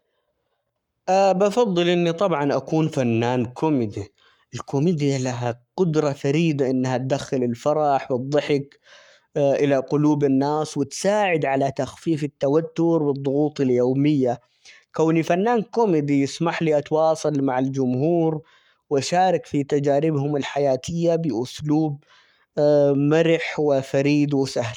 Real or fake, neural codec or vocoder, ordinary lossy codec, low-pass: fake; codec, 44.1 kHz, 7.8 kbps, Pupu-Codec; none; 19.8 kHz